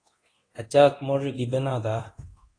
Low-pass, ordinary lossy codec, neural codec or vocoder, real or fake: 9.9 kHz; AAC, 32 kbps; codec, 24 kHz, 0.9 kbps, DualCodec; fake